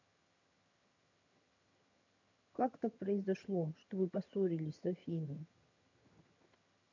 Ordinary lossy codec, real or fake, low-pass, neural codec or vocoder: none; fake; 7.2 kHz; vocoder, 22.05 kHz, 80 mel bands, HiFi-GAN